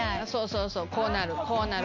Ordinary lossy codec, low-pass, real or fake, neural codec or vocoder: none; 7.2 kHz; real; none